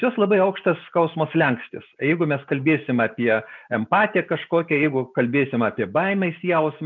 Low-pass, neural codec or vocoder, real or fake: 7.2 kHz; none; real